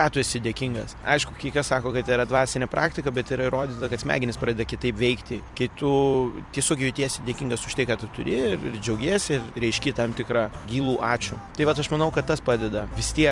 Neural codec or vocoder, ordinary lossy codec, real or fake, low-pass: vocoder, 44.1 kHz, 128 mel bands every 256 samples, BigVGAN v2; MP3, 96 kbps; fake; 10.8 kHz